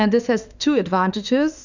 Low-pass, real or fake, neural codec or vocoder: 7.2 kHz; fake; autoencoder, 48 kHz, 32 numbers a frame, DAC-VAE, trained on Japanese speech